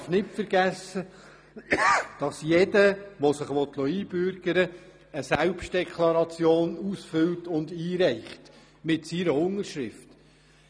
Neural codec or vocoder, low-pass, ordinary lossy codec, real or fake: none; none; none; real